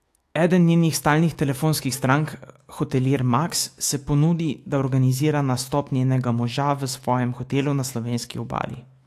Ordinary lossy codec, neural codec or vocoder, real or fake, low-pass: AAC, 64 kbps; autoencoder, 48 kHz, 128 numbers a frame, DAC-VAE, trained on Japanese speech; fake; 14.4 kHz